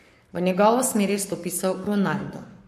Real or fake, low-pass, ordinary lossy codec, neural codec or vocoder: fake; 14.4 kHz; MP3, 64 kbps; codec, 44.1 kHz, 7.8 kbps, Pupu-Codec